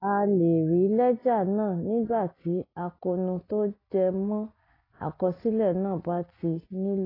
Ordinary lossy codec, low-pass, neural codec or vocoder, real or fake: AAC, 24 kbps; 5.4 kHz; none; real